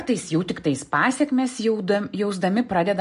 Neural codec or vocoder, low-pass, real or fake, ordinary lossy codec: none; 10.8 kHz; real; MP3, 48 kbps